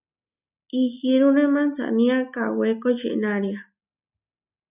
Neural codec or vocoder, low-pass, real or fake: none; 3.6 kHz; real